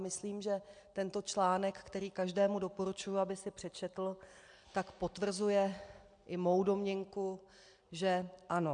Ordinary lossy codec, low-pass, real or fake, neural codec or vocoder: MP3, 64 kbps; 9.9 kHz; real; none